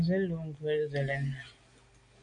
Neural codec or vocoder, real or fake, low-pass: none; real; 9.9 kHz